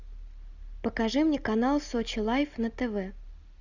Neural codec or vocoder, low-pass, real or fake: none; 7.2 kHz; real